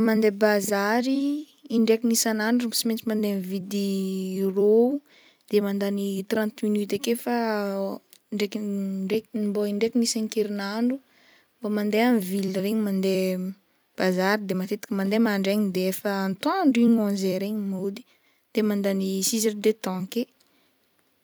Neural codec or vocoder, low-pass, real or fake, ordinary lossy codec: vocoder, 44.1 kHz, 128 mel bands every 256 samples, BigVGAN v2; none; fake; none